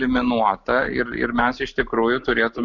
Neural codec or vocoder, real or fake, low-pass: none; real; 7.2 kHz